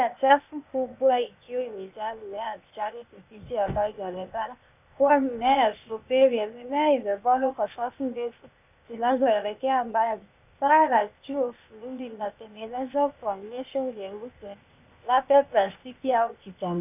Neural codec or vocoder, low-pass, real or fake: codec, 16 kHz, 0.8 kbps, ZipCodec; 3.6 kHz; fake